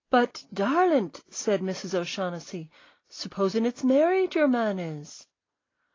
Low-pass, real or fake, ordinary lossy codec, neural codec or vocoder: 7.2 kHz; real; AAC, 32 kbps; none